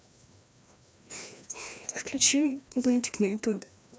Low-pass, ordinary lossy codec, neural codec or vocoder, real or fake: none; none; codec, 16 kHz, 1 kbps, FreqCodec, larger model; fake